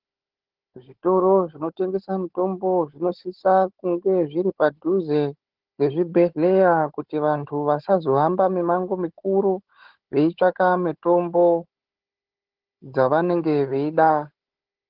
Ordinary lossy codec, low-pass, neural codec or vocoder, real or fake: Opus, 16 kbps; 5.4 kHz; codec, 16 kHz, 16 kbps, FunCodec, trained on Chinese and English, 50 frames a second; fake